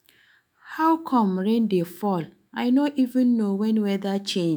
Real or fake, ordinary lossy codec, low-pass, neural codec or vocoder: fake; none; none; autoencoder, 48 kHz, 128 numbers a frame, DAC-VAE, trained on Japanese speech